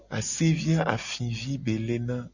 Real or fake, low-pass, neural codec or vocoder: real; 7.2 kHz; none